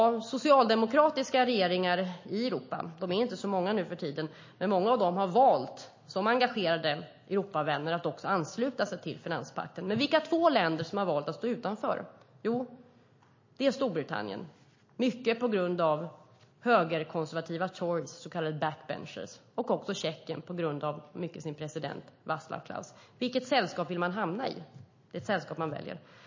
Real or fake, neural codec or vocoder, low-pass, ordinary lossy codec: real; none; 7.2 kHz; MP3, 32 kbps